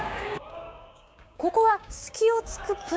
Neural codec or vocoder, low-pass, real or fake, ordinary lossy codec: codec, 16 kHz, 6 kbps, DAC; none; fake; none